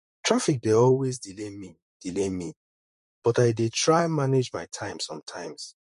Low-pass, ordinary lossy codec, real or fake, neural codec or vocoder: 14.4 kHz; MP3, 48 kbps; fake; vocoder, 44.1 kHz, 128 mel bands, Pupu-Vocoder